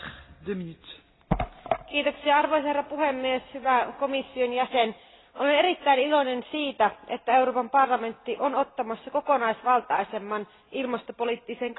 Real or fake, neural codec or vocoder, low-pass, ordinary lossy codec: real; none; 7.2 kHz; AAC, 16 kbps